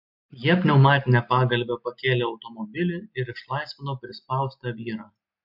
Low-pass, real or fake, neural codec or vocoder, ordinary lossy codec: 5.4 kHz; real; none; MP3, 48 kbps